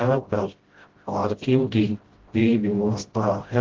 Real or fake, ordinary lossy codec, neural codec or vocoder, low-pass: fake; Opus, 16 kbps; codec, 16 kHz, 0.5 kbps, FreqCodec, smaller model; 7.2 kHz